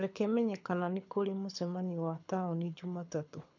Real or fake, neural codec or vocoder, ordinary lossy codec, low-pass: fake; codec, 24 kHz, 6 kbps, HILCodec; none; 7.2 kHz